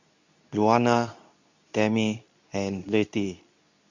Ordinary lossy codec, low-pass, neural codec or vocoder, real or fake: none; 7.2 kHz; codec, 24 kHz, 0.9 kbps, WavTokenizer, medium speech release version 2; fake